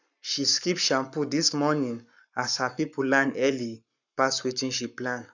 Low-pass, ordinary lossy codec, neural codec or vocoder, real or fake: 7.2 kHz; none; codec, 44.1 kHz, 7.8 kbps, Pupu-Codec; fake